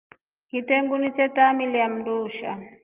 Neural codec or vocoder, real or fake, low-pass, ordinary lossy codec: none; real; 3.6 kHz; Opus, 32 kbps